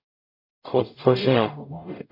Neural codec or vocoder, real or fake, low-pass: codec, 44.1 kHz, 0.9 kbps, DAC; fake; 5.4 kHz